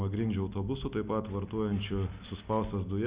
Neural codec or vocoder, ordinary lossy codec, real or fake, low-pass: none; Opus, 64 kbps; real; 3.6 kHz